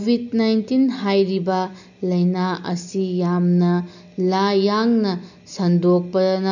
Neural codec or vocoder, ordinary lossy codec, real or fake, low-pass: none; none; real; 7.2 kHz